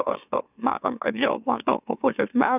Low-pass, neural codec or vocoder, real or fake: 3.6 kHz; autoencoder, 44.1 kHz, a latent of 192 numbers a frame, MeloTTS; fake